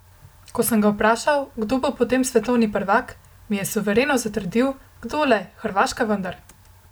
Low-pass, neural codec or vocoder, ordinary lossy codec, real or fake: none; none; none; real